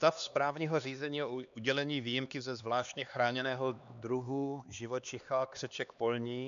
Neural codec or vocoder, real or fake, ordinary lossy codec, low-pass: codec, 16 kHz, 2 kbps, X-Codec, HuBERT features, trained on LibriSpeech; fake; MP3, 96 kbps; 7.2 kHz